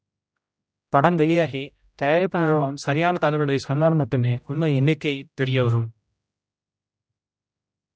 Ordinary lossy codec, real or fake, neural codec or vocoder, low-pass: none; fake; codec, 16 kHz, 0.5 kbps, X-Codec, HuBERT features, trained on general audio; none